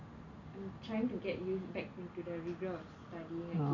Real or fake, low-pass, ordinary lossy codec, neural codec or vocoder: real; 7.2 kHz; none; none